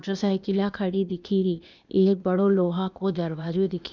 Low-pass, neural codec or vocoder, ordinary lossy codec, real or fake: 7.2 kHz; codec, 16 kHz, 0.8 kbps, ZipCodec; none; fake